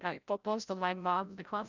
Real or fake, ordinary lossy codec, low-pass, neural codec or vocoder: fake; none; 7.2 kHz; codec, 16 kHz, 0.5 kbps, FreqCodec, larger model